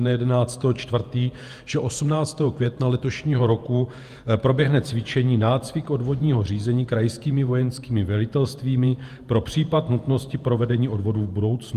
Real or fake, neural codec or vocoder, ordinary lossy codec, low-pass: fake; vocoder, 48 kHz, 128 mel bands, Vocos; Opus, 32 kbps; 14.4 kHz